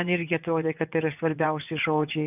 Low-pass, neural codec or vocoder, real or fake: 3.6 kHz; vocoder, 24 kHz, 100 mel bands, Vocos; fake